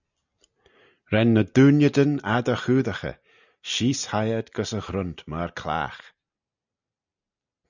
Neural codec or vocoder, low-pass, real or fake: none; 7.2 kHz; real